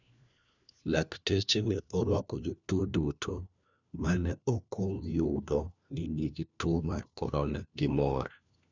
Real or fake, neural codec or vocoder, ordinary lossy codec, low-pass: fake; codec, 16 kHz, 1 kbps, FunCodec, trained on LibriTTS, 50 frames a second; none; 7.2 kHz